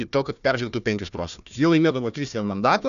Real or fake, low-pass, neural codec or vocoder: fake; 7.2 kHz; codec, 16 kHz, 1 kbps, FunCodec, trained on Chinese and English, 50 frames a second